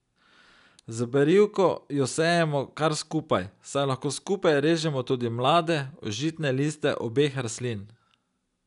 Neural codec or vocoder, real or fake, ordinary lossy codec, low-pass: none; real; none; 10.8 kHz